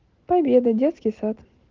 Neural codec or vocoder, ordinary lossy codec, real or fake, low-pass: none; Opus, 16 kbps; real; 7.2 kHz